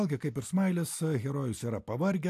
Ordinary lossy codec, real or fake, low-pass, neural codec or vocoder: AAC, 64 kbps; real; 14.4 kHz; none